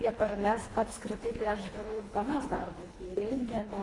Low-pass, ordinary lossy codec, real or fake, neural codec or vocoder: 10.8 kHz; AAC, 32 kbps; fake; codec, 24 kHz, 1.5 kbps, HILCodec